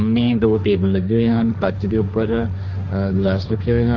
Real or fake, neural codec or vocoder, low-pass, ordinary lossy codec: fake; codec, 16 kHz, 1.1 kbps, Voila-Tokenizer; 7.2 kHz; none